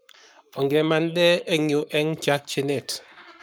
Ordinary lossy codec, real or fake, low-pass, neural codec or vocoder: none; fake; none; codec, 44.1 kHz, 7.8 kbps, Pupu-Codec